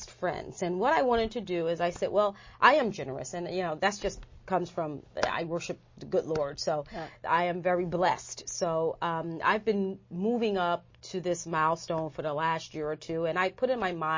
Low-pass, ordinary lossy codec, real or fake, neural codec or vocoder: 7.2 kHz; MP3, 32 kbps; real; none